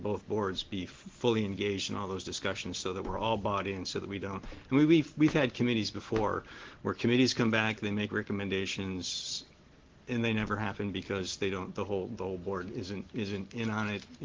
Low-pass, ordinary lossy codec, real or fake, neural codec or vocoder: 7.2 kHz; Opus, 16 kbps; real; none